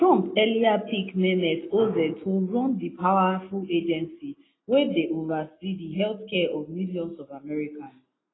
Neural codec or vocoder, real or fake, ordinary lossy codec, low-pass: none; real; AAC, 16 kbps; 7.2 kHz